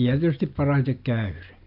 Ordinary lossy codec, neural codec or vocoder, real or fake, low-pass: none; none; real; 5.4 kHz